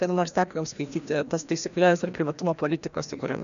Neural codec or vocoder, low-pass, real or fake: codec, 16 kHz, 1 kbps, FreqCodec, larger model; 7.2 kHz; fake